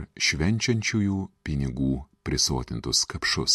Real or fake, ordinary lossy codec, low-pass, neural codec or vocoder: real; MP3, 64 kbps; 14.4 kHz; none